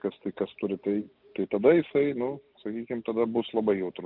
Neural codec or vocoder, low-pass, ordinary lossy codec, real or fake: none; 5.4 kHz; Opus, 24 kbps; real